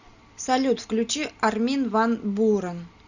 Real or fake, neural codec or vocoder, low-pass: real; none; 7.2 kHz